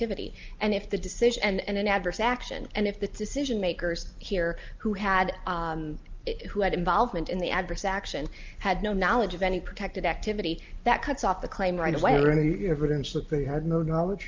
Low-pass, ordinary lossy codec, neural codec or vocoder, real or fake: 7.2 kHz; Opus, 24 kbps; none; real